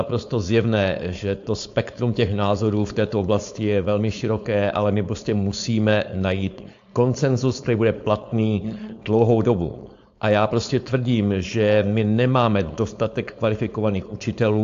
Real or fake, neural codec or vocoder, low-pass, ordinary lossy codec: fake; codec, 16 kHz, 4.8 kbps, FACodec; 7.2 kHz; AAC, 64 kbps